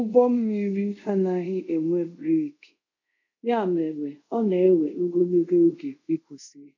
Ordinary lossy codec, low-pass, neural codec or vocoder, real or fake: none; 7.2 kHz; codec, 24 kHz, 0.5 kbps, DualCodec; fake